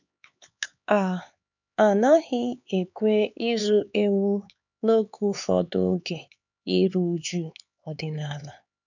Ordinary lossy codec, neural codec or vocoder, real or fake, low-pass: none; codec, 16 kHz, 4 kbps, X-Codec, HuBERT features, trained on LibriSpeech; fake; 7.2 kHz